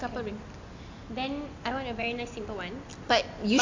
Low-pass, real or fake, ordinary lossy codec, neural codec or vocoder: 7.2 kHz; real; AAC, 48 kbps; none